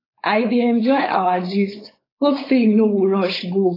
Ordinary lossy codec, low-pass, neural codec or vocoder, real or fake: AAC, 24 kbps; 5.4 kHz; codec, 16 kHz, 4.8 kbps, FACodec; fake